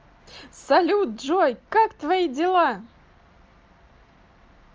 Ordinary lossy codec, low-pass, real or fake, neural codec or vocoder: Opus, 24 kbps; 7.2 kHz; real; none